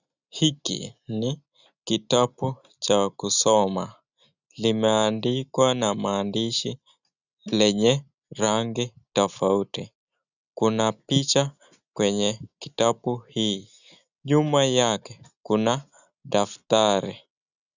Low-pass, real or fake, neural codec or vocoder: 7.2 kHz; real; none